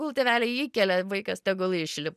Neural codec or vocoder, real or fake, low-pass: none; real; 14.4 kHz